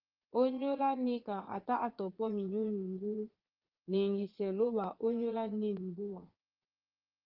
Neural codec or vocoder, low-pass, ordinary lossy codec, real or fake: vocoder, 22.05 kHz, 80 mel bands, Vocos; 5.4 kHz; Opus, 16 kbps; fake